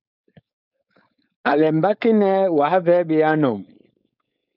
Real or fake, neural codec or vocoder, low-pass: fake; codec, 16 kHz, 4.8 kbps, FACodec; 5.4 kHz